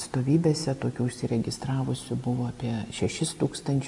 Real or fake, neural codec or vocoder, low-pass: real; none; 10.8 kHz